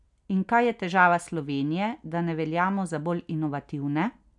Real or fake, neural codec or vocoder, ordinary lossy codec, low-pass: real; none; none; 10.8 kHz